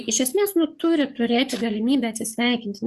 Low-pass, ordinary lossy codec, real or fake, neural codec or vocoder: 14.4 kHz; Opus, 64 kbps; fake; codec, 44.1 kHz, 7.8 kbps, DAC